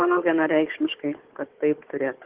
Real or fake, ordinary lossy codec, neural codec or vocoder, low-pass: fake; Opus, 16 kbps; codec, 16 kHz, 4 kbps, FunCodec, trained on Chinese and English, 50 frames a second; 3.6 kHz